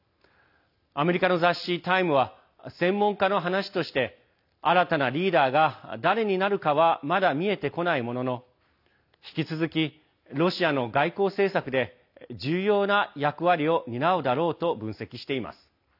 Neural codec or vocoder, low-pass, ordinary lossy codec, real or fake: none; 5.4 kHz; AAC, 48 kbps; real